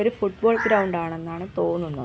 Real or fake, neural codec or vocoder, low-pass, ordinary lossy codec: real; none; none; none